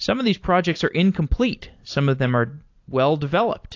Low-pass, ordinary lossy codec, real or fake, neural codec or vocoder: 7.2 kHz; AAC, 48 kbps; fake; vocoder, 44.1 kHz, 128 mel bands every 256 samples, BigVGAN v2